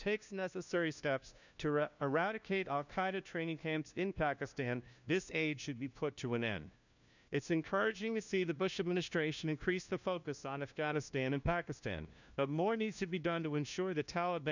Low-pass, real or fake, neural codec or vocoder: 7.2 kHz; fake; codec, 16 kHz, 1 kbps, FunCodec, trained on LibriTTS, 50 frames a second